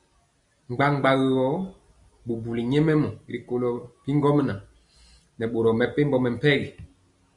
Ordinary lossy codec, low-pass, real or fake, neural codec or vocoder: Opus, 64 kbps; 10.8 kHz; real; none